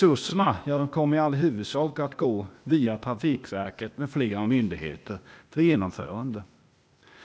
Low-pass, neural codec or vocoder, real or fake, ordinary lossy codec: none; codec, 16 kHz, 0.8 kbps, ZipCodec; fake; none